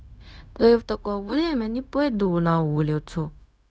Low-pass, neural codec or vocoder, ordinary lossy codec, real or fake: none; codec, 16 kHz, 0.4 kbps, LongCat-Audio-Codec; none; fake